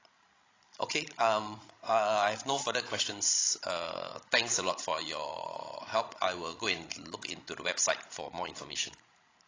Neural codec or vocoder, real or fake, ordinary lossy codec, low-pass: codec, 16 kHz, 16 kbps, FreqCodec, larger model; fake; AAC, 32 kbps; 7.2 kHz